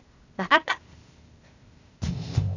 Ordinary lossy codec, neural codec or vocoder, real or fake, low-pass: none; codec, 16 kHz, 0.8 kbps, ZipCodec; fake; 7.2 kHz